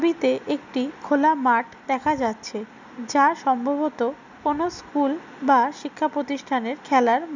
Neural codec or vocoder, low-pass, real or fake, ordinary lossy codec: none; 7.2 kHz; real; none